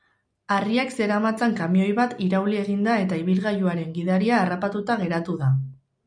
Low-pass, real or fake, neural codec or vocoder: 9.9 kHz; real; none